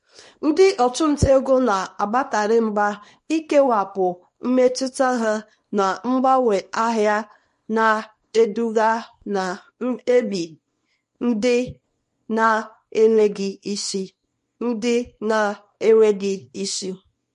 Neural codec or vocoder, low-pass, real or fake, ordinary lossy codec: codec, 24 kHz, 0.9 kbps, WavTokenizer, small release; 10.8 kHz; fake; MP3, 48 kbps